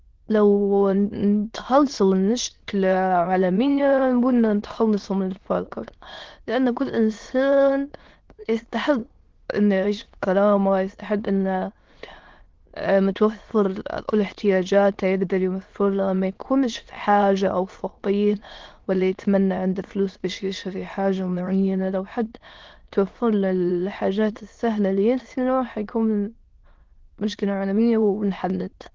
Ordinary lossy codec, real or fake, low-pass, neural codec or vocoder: Opus, 16 kbps; fake; 7.2 kHz; autoencoder, 22.05 kHz, a latent of 192 numbers a frame, VITS, trained on many speakers